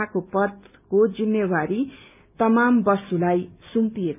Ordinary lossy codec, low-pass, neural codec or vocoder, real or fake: none; 3.6 kHz; none; real